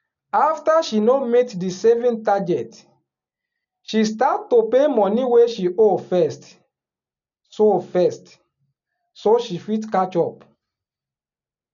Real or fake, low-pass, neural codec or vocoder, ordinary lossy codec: real; 7.2 kHz; none; none